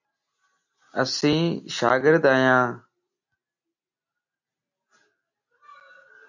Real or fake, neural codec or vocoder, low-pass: real; none; 7.2 kHz